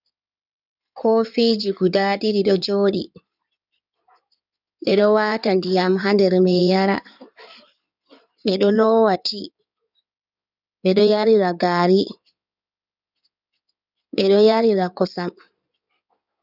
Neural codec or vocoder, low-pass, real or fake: codec, 16 kHz in and 24 kHz out, 2.2 kbps, FireRedTTS-2 codec; 5.4 kHz; fake